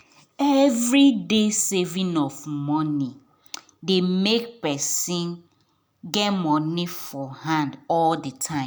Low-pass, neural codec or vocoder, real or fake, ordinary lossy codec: none; none; real; none